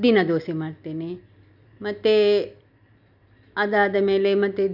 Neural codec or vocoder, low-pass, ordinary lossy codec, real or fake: none; 5.4 kHz; none; real